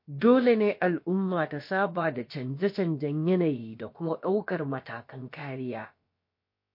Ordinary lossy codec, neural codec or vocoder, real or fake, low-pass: MP3, 32 kbps; codec, 16 kHz, about 1 kbps, DyCAST, with the encoder's durations; fake; 5.4 kHz